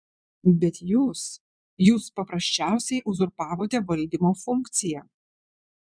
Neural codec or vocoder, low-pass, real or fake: vocoder, 22.05 kHz, 80 mel bands, Vocos; 9.9 kHz; fake